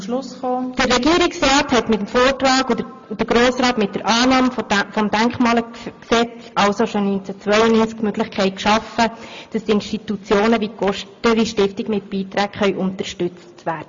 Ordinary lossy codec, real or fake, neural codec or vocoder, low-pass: MP3, 48 kbps; real; none; 7.2 kHz